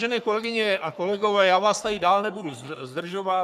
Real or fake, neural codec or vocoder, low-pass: fake; codec, 44.1 kHz, 3.4 kbps, Pupu-Codec; 14.4 kHz